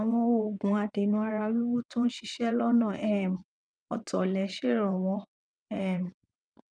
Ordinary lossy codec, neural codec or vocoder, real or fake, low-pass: none; vocoder, 22.05 kHz, 80 mel bands, WaveNeXt; fake; 9.9 kHz